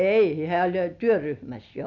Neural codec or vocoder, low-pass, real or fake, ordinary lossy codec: none; 7.2 kHz; real; none